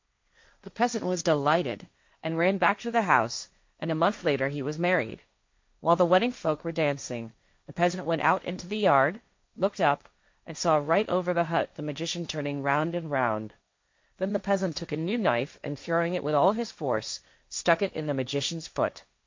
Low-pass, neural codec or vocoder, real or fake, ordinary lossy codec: 7.2 kHz; codec, 16 kHz, 1.1 kbps, Voila-Tokenizer; fake; MP3, 48 kbps